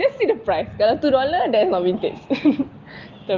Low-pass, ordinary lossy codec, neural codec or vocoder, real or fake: 7.2 kHz; Opus, 24 kbps; none; real